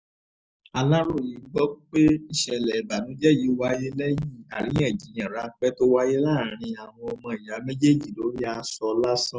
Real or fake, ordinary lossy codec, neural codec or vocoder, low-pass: real; none; none; none